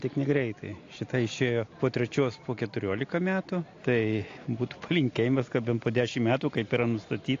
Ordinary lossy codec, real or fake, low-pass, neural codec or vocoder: AAC, 48 kbps; real; 7.2 kHz; none